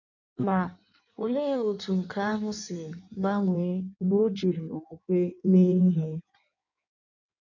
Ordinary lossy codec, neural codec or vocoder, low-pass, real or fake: none; codec, 16 kHz in and 24 kHz out, 1.1 kbps, FireRedTTS-2 codec; 7.2 kHz; fake